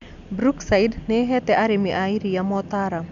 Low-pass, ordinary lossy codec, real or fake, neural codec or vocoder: 7.2 kHz; none; real; none